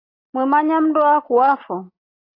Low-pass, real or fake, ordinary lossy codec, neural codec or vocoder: 5.4 kHz; real; AAC, 24 kbps; none